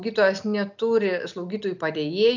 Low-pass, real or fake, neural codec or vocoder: 7.2 kHz; real; none